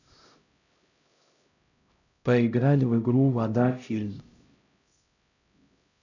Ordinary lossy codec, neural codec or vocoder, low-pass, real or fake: none; codec, 16 kHz, 0.5 kbps, X-Codec, HuBERT features, trained on LibriSpeech; 7.2 kHz; fake